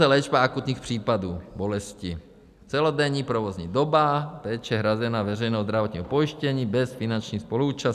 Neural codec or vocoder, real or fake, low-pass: none; real; 14.4 kHz